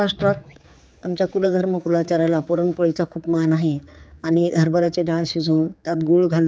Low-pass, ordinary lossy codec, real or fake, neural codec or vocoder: none; none; fake; codec, 16 kHz, 4 kbps, X-Codec, HuBERT features, trained on general audio